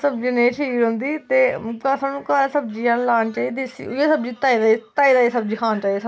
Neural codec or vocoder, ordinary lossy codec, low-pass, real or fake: none; none; none; real